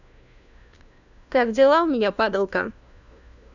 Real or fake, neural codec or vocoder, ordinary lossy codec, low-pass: fake; codec, 16 kHz, 1 kbps, FunCodec, trained on LibriTTS, 50 frames a second; none; 7.2 kHz